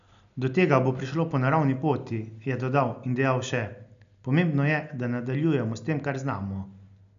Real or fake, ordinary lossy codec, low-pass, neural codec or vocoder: real; none; 7.2 kHz; none